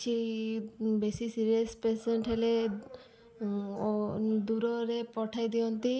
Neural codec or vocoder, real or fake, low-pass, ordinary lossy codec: none; real; none; none